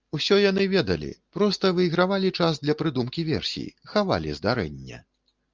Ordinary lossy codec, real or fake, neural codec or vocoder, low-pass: Opus, 24 kbps; real; none; 7.2 kHz